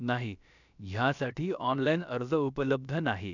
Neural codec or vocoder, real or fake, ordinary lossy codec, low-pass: codec, 16 kHz, about 1 kbps, DyCAST, with the encoder's durations; fake; none; 7.2 kHz